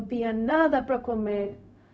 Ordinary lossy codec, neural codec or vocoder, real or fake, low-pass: none; codec, 16 kHz, 0.4 kbps, LongCat-Audio-Codec; fake; none